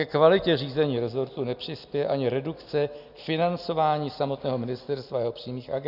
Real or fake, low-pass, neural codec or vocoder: real; 5.4 kHz; none